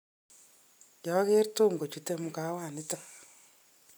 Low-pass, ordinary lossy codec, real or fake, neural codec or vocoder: none; none; real; none